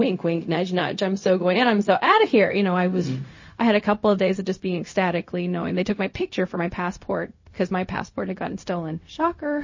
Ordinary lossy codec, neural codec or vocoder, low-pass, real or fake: MP3, 32 kbps; codec, 16 kHz, 0.4 kbps, LongCat-Audio-Codec; 7.2 kHz; fake